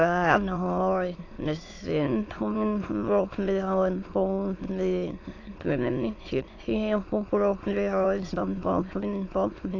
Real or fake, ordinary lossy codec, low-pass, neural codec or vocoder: fake; AAC, 32 kbps; 7.2 kHz; autoencoder, 22.05 kHz, a latent of 192 numbers a frame, VITS, trained on many speakers